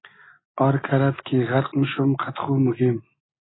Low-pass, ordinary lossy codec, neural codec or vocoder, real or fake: 7.2 kHz; AAC, 16 kbps; none; real